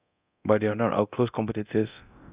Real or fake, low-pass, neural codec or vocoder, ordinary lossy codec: fake; 3.6 kHz; codec, 24 kHz, 0.9 kbps, DualCodec; Opus, 64 kbps